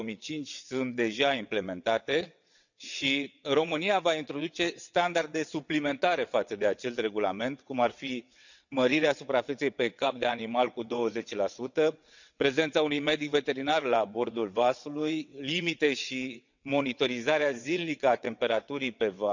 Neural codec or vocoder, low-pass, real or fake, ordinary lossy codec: vocoder, 22.05 kHz, 80 mel bands, WaveNeXt; 7.2 kHz; fake; none